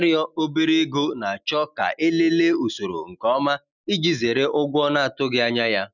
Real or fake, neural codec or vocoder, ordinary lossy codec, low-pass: real; none; none; 7.2 kHz